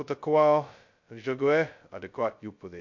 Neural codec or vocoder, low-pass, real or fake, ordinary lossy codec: codec, 16 kHz, 0.2 kbps, FocalCodec; 7.2 kHz; fake; MP3, 48 kbps